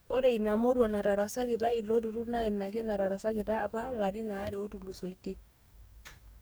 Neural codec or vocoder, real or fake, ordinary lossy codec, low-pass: codec, 44.1 kHz, 2.6 kbps, DAC; fake; none; none